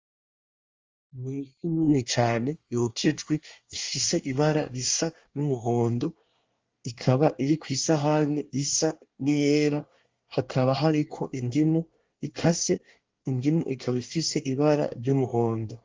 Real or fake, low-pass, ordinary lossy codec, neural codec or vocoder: fake; 7.2 kHz; Opus, 32 kbps; codec, 24 kHz, 1 kbps, SNAC